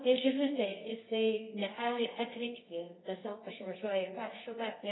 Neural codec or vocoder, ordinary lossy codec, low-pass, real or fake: codec, 24 kHz, 0.9 kbps, WavTokenizer, medium music audio release; AAC, 16 kbps; 7.2 kHz; fake